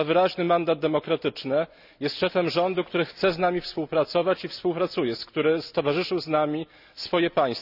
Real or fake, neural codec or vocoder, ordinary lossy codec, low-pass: real; none; none; 5.4 kHz